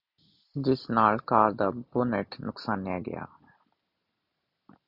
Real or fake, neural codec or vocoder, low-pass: real; none; 5.4 kHz